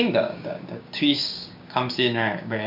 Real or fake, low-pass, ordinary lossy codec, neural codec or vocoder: fake; 5.4 kHz; MP3, 48 kbps; codec, 16 kHz, 6 kbps, DAC